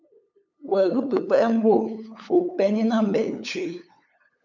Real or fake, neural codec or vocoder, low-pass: fake; codec, 16 kHz, 8 kbps, FunCodec, trained on LibriTTS, 25 frames a second; 7.2 kHz